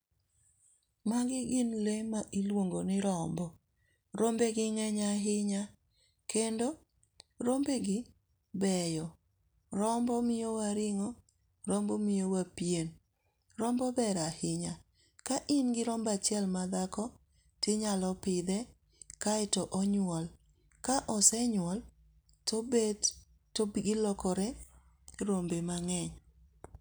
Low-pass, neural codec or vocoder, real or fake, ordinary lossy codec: none; none; real; none